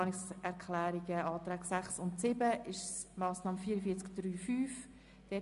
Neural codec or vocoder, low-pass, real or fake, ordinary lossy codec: none; 10.8 kHz; real; MP3, 64 kbps